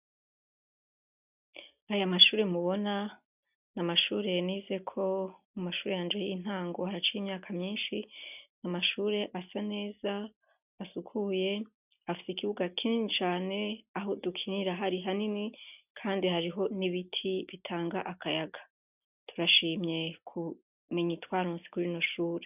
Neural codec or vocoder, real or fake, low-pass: none; real; 3.6 kHz